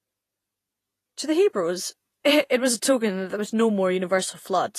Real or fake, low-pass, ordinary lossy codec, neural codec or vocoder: fake; 14.4 kHz; AAC, 48 kbps; vocoder, 48 kHz, 128 mel bands, Vocos